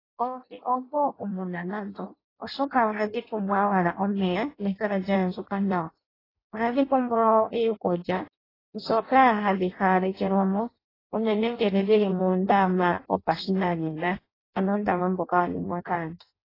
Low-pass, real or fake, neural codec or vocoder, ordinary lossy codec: 5.4 kHz; fake; codec, 16 kHz in and 24 kHz out, 0.6 kbps, FireRedTTS-2 codec; AAC, 24 kbps